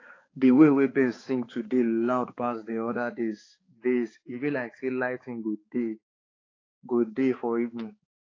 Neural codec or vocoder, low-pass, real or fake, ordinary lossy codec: codec, 16 kHz, 4 kbps, X-Codec, HuBERT features, trained on balanced general audio; 7.2 kHz; fake; AAC, 32 kbps